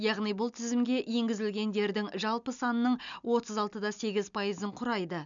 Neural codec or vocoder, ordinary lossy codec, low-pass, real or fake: none; none; 7.2 kHz; real